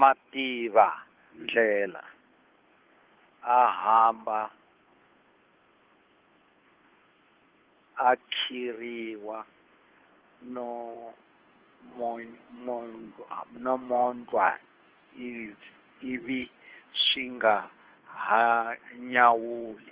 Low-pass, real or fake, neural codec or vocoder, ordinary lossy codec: 3.6 kHz; fake; codec, 16 kHz, 2 kbps, FunCodec, trained on Chinese and English, 25 frames a second; Opus, 16 kbps